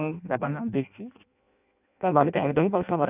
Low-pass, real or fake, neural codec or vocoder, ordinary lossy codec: 3.6 kHz; fake; codec, 16 kHz in and 24 kHz out, 0.6 kbps, FireRedTTS-2 codec; none